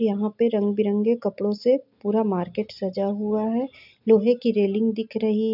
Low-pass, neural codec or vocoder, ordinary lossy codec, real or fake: 5.4 kHz; none; none; real